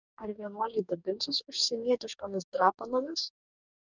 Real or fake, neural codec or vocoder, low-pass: fake; codec, 44.1 kHz, 2.6 kbps, DAC; 7.2 kHz